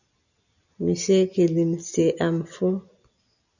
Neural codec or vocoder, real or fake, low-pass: none; real; 7.2 kHz